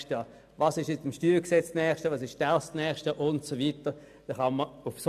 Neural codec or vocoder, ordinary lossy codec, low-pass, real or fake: none; AAC, 96 kbps; 14.4 kHz; real